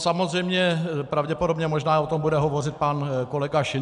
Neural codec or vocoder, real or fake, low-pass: none; real; 10.8 kHz